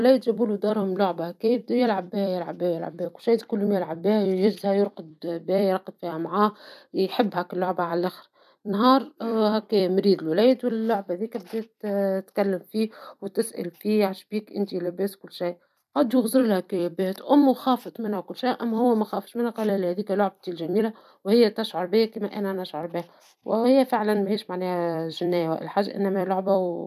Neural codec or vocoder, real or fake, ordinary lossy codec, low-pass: vocoder, 44.1 kHz, 128 mel bands every 256 samples, BigVGAN v2; fake; none; 14.4 kHz